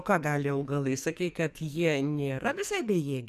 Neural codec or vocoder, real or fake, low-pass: codec, 44.1 kHz, 2.6 kbps, SNAC; fake; 14.4 kHz